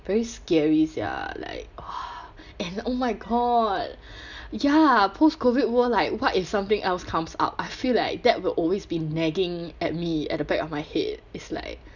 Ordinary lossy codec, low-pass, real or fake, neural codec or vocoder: none; 7.2 kHz; real; none